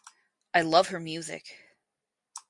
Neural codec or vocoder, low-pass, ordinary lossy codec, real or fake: none; 10.8 kHz; MP3, 96 kbps; real